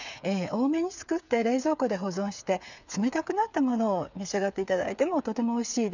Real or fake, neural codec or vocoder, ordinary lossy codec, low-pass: fake; codec, 16 kHz, 8 kbps, FreqCodec, smaller model; none; 7.2 kHz